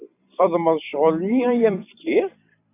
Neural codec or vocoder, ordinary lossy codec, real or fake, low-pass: vocoder, 44.1 kHz, 128 mel bands every 256 samples, BigVGAN v2; AAC, 24 kbps; fake; 3.6 kHz